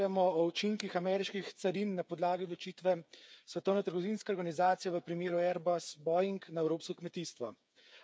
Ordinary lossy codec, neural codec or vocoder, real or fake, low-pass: none; codec, 16 kHz, 8 kbps, FreqCodec, smaller model; fake; none